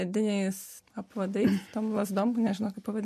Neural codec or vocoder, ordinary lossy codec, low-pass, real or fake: none; MP3, 64 kbps; 14.4 kHz; real